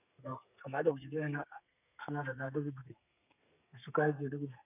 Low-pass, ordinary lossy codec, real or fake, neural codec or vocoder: 3.6 kHz; none; fake; codec, 32 kHz, 1.9 kbps, SNAC